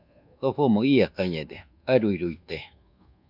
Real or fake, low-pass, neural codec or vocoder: fake; 5.4 kHz; codec, 24 kHz, 1.2 kbps, DualCodec